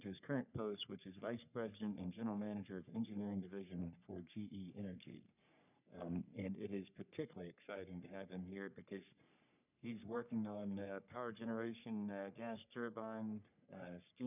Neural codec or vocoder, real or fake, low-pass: codec, 44.1 kHz, 3.4 kbps, Pupu-Codec; fake; 3.6 kHz